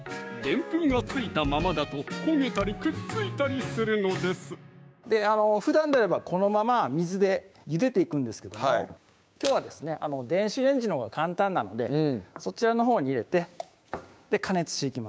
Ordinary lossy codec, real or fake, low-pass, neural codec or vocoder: none; fake; none; codec, 16 kHz, 6 kbps, DAC